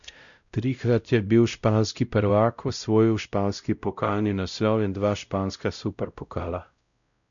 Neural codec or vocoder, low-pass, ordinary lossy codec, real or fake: codec, 16 kHz, 0.5 kbps, X-Codec, WavLM features, trained on Multilingual LibriSpeech; 7.2 kHz; none; fake